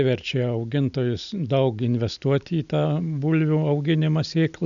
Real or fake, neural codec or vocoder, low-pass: real; none; 7.2 kHz